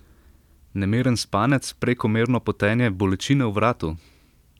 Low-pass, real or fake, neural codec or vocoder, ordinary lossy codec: 19.8 kHz; real; none; none